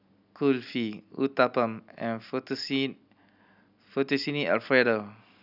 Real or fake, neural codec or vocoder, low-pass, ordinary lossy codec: real; none; 5.4 kHz; none